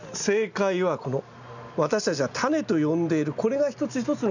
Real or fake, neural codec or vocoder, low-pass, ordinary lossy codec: real; none; 7.2 kHz; none